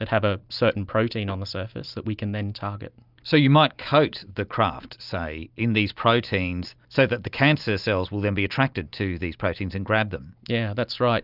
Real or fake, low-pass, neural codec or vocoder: real; 5.4 kHz; none